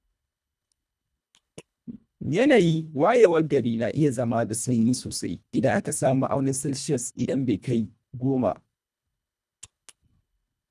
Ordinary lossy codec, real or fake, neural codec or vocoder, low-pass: none; fake; codec, 24 kHz, 1.5 kbps, HILCodec; none